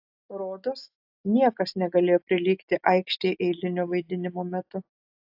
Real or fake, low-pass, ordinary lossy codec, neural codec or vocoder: real; 5.4 kHz; AAC, 48 kbps; none